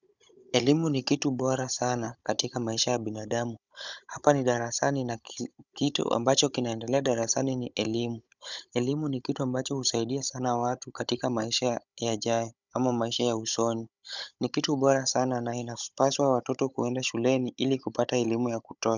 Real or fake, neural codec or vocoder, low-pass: fake; codec, 16 kHz, 16 kbps, FunCodec, trained on Chinese and English, 50 frames a second; 7.2 kHz